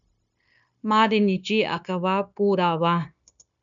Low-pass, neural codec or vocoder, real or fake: 7.2 kHz; codec, 16 kHz, 0.9 kbps, LongCat-Audio-Codec; fake